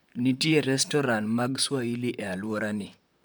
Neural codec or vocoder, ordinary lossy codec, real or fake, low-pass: vocoder, 44.1 kHz, 128 mel bands, Pupu-Vocoder; none; fake; none